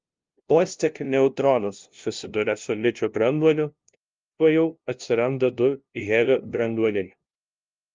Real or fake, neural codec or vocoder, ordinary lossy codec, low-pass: fake; codec, 16 kHz, 0.5 kbps, FunCodec, trained on LibriTTS, 25 frames a second; Opus, 32 kbps; 7.2 kHz